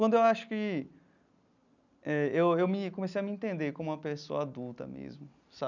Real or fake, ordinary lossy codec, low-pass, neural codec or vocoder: real; none; 7.2 kHz; none